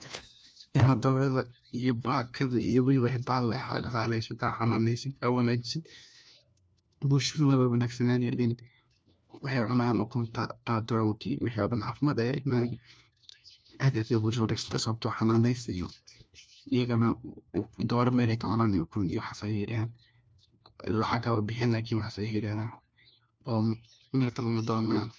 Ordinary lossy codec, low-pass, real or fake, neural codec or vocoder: none; none; fake; codec, 16 kHz, 1 kbps, FunCodec, trained on LibriTTS, 50 frames a second